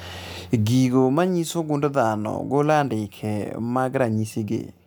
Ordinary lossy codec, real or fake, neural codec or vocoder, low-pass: none; real; none; none